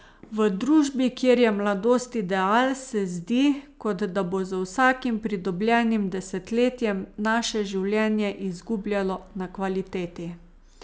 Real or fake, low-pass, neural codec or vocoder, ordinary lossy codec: real; none; none; none